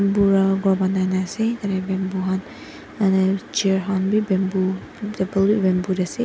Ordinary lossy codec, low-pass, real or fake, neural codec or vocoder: none; none; real; none